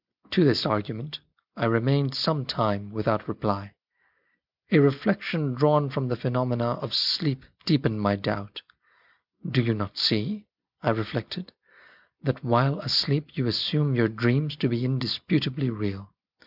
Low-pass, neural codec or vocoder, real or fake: 5.4 kHz; none; real